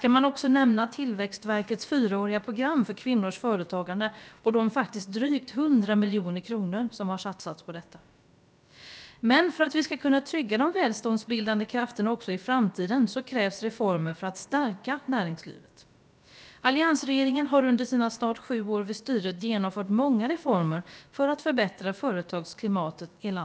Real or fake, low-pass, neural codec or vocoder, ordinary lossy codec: fake; none; codec, 16 kHz, about 1 kbps, DyCAST, with the encoder's durations; none